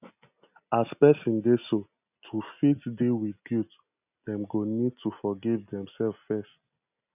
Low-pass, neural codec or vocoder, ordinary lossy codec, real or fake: 3.6 kHz; none; none; real